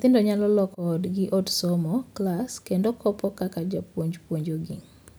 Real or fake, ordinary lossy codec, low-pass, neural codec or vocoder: real; none; none; none